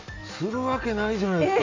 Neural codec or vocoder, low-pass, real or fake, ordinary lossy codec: none; 7.2 kHz; real; MP3, 48 kbps